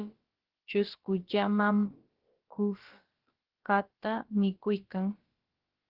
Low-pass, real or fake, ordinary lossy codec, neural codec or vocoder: 5.4 kHz; fake; Opus, 16 kbps; codec, 16 kHz, about 1 kbps, DyCAST, with the encoder's durations